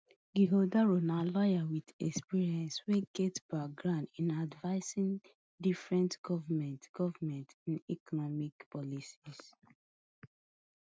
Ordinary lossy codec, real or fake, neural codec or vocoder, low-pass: none; real; none; none